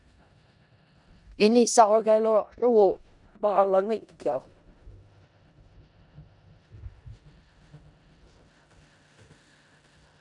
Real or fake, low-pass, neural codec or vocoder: fake; 10.8 kHz; codec, 16 kHz in and 24 kHz out, 0.4 kbps, LongCat-Audio-Codec, four codebook decoder